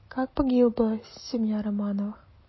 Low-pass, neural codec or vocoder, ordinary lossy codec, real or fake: 7.2 kHz; none; MP3, 24 kbps; real